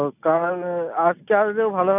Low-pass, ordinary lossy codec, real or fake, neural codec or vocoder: 3.6 kHz; none; real; none